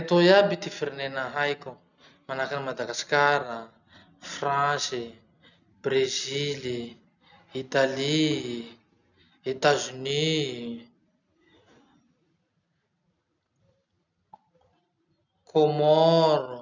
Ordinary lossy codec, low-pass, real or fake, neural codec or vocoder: none; 7.2 kHz; real; none